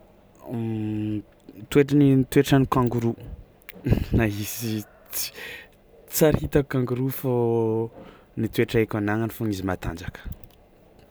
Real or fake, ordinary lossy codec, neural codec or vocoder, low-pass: real; none; none; none